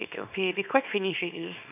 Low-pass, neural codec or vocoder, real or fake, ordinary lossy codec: 3.6 kHz; codec, 24 kHz, 0.9 kbps, WavTokenizer, small release; fake; none